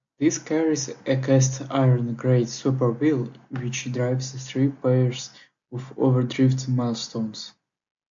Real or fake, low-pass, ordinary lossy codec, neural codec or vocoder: real; 7.2 kHz; AAC, 64 kbps; none